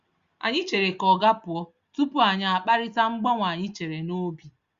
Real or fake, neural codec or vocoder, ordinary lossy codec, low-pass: real; none; Opus, 64 kbps; 7.2 kHz